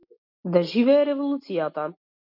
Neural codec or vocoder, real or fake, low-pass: none; real; 5.4 kHz